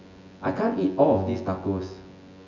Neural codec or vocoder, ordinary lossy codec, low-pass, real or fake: vocoder, 24 kHz, 100 mel bands, Vocos; none; 7.2 kHz; fake